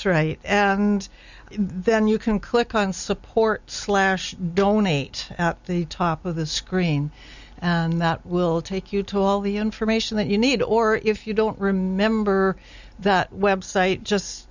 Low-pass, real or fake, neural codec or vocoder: 7.2 kHz; real; none